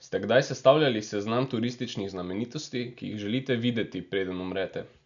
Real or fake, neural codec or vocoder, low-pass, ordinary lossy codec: real; none; 7.2 kHz; none